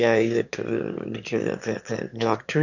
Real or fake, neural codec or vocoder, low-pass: fake; autoencoder, 22.05 kHz, a latent of 192 numbers a frame, VITS, trained on one speaker; 7.2 kHz